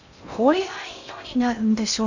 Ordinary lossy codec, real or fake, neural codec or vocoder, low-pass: none; fake; codec, 16 kHz in and 24 kHz out, 0.6 kbps, FocalCodec, streaming, 2048 codes; 7.2 kHz